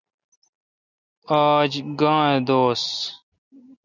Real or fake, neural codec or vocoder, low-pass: real; none; 7.2 kHz